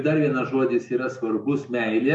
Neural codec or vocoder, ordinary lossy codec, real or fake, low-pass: none; MP3, 64 kbps; real; 10.8 kHz